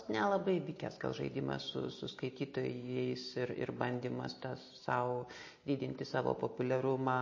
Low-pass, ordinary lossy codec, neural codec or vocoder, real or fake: 7.2 kHz; MP3, 32 kbps; none; real